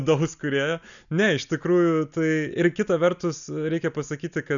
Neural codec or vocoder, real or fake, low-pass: none; real; 7.2 kHz